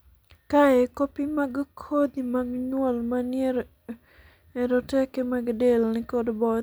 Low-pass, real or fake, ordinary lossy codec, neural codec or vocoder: none; real; none; none